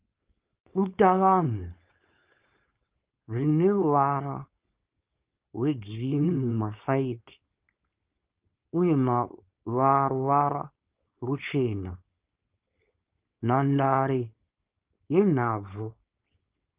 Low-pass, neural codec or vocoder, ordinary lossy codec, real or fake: 3.6 kHz; codec, 16 kHz, 4.8 kbps, FACodec; Opus, 32 kbps; fake